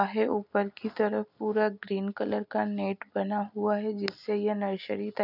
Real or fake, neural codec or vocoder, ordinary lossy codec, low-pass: real; none; none; 5.4 kHz